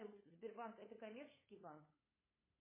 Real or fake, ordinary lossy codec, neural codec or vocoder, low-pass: fake; MP3, 16 kbps; codec, 16 kHz, 8 kbps, FunCodec, trained on LibriTTS, 25 frames a second; 3.6 kHz